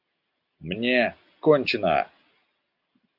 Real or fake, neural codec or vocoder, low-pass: real; none; 5.4 kHz